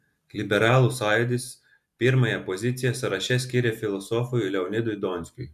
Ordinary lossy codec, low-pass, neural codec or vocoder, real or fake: MP3, 96 kbps; 14.4 kHz; none; real